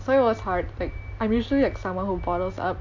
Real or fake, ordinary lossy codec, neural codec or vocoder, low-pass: real; MP3, 48 kbps; none; 7.2 kHz